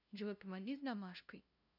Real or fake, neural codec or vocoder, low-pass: fake; codec, 16 kHz, 0.5 kbps, FunCodec, trained on LibriTTS, 25 frames a second; 5.4 kHz